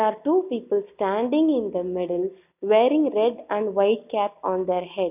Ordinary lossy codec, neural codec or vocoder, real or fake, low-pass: none; none; real; 3.6 kHz